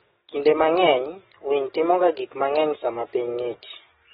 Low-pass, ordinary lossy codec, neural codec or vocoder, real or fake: 19.8 kHz; AAC, 16 kbps; codec, 44.1 kHz, 7.8 kbps, DAC; fake